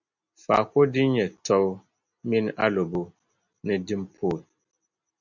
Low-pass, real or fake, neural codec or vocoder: 7.2 kHz; real; none